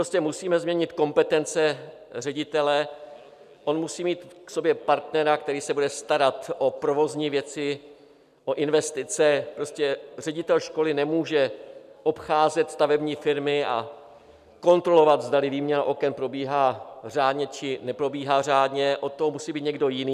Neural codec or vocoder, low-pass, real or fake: none; 14.4 kHz; real